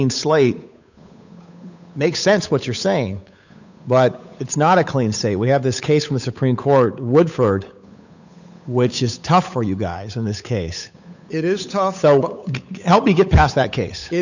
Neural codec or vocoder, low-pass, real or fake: codec, 16 kHz, 8 kbps, FunCodec, trained on Chinese and English, 25 frames a second; 7.2 kHz; fake